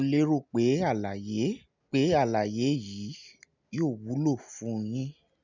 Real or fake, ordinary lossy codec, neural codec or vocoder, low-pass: real; none; none; 7.2 kHz